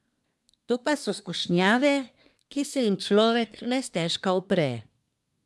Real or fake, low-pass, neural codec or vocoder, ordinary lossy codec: fake; none; codec, 24 kHz, 1 kbps, SNAC; none